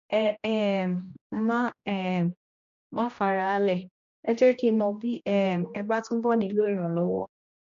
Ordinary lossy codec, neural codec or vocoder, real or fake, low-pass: MP3, 48 kbps; codec, 16 kHz, 1 kbps, X-Codec, HuBERT features, trained on general audio; fake; 7.2 kHz